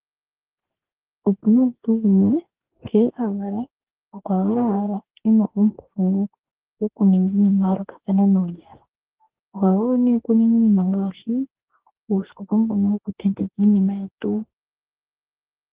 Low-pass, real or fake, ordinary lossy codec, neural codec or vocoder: 3.6 kHz; fake; Opus, 16 kbps; codec, 44.1 kHz, 2.6 kbps, DAC